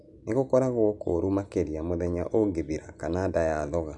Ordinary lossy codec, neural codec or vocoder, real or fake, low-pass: none; none; real; 10.8 kHz